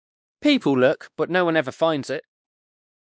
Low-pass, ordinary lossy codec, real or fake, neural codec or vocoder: none; none; fake; codec, 16 kHz, 2 kbps, X-Codec, WavLM features, trained on Multilingual LibriSpeech